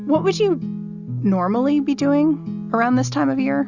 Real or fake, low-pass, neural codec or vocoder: real; 7.2 kHz; none